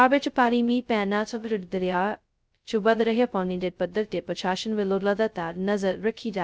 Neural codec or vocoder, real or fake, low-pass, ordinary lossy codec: codec, 16 kHz, 0.2 kbps, FocalCodec; fake; none; none